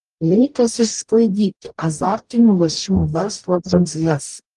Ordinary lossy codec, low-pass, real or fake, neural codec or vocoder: Opus, 32 kbps; 10.8 kHz; fake; codec, 44.1 kHz, 0.9 kbps, DAC